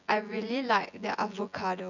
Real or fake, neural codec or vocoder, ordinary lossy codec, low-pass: fake; vocoder, 24 kHz, 100 mel bands, Vocos; none; 7.2 kHz